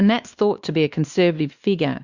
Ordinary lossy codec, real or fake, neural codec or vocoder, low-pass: Opus, 64 kbps; fake; codec, 16 kHz, 2 kbps, X-Codec, WavLM features, trained on Multilingual LibriSpeech; 7.2 kHz